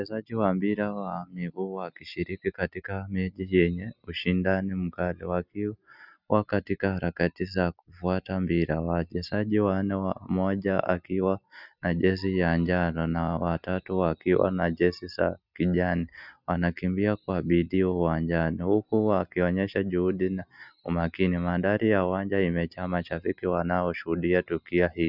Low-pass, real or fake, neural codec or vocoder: 5.4 kHz; real; none